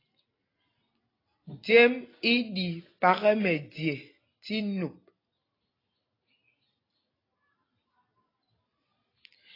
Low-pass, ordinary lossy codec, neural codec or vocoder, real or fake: 5.4 kHz; AAC, 24 kbps; none; real